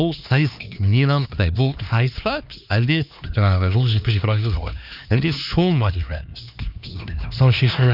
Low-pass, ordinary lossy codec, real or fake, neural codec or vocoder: 5.4 kHz; none; fake; codec, 16 kHz, 2 kbps, X-Codec, HuBERT features, trained on LibriSpeech